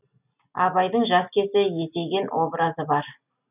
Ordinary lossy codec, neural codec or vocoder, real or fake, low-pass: none; none; real; 3.6 kHz